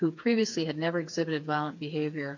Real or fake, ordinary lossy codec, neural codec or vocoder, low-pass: fake; AAC, 48 kbps; codec, 16 kHz, 4 kbps, FreqCodec, smaller model; 7.2 kHz